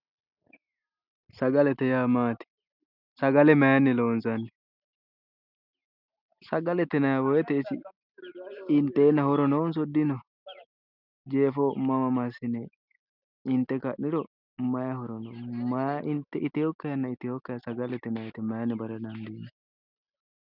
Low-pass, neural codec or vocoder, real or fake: 5.4 kHz; none; real